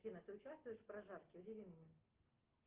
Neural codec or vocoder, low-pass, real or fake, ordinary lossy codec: none; 3.6 kHz; real; Opus, 16 kbps